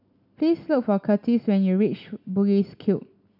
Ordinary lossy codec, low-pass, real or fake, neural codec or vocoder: none; 5.4 kHz; real; none